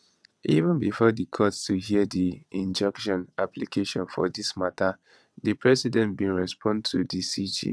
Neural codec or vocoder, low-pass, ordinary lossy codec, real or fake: vocoder, 22.05 kHz, 80 mel bands, WaveNeXt; none; none; fake